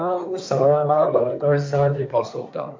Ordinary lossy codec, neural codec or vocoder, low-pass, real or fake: MP3, 48 kbps; codec, 24 kHz, 1 kbps, SNAC; 7.2 kHz; fake